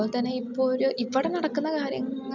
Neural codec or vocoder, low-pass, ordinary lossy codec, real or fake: none; 7.2 kHz; none; real